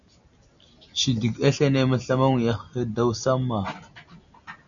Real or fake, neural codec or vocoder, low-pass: real; none; 7.2 kHz